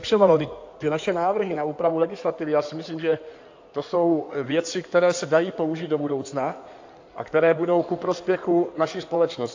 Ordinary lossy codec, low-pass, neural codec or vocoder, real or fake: AAC, 48 kbps; 7.2 kHz; codec, 16 kHz in and 24 kHz out, 2.2 kbps, FireRedTTS-2 codec; fake